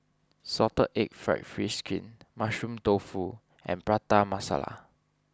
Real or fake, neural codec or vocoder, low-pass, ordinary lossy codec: real; none; none; none